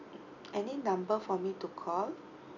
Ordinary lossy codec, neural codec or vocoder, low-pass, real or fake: none; none; 7.2 kHz; real